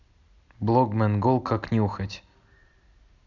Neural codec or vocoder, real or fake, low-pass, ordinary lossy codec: none; real; 7.2 kHz; none